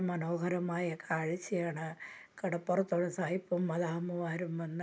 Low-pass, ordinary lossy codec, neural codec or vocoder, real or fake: none; none; none; real